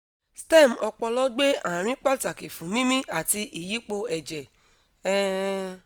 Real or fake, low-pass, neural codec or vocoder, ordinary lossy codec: real; none; none; none